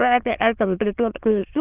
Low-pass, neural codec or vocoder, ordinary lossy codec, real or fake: 3.6 kHz; autoencoder, 22.05 kHz, a latent of 192 numbers a frame, VITS, trained on many speakers; Opus, 24 kbps; fake